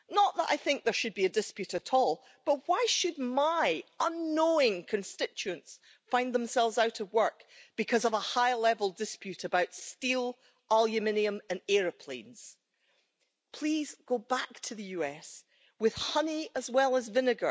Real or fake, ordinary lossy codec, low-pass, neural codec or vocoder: real; none; none; none